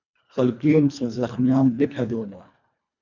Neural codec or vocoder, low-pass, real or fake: codec, 24 kHz, 1.5 kbps, HILCodec; 7.2 kHz; fake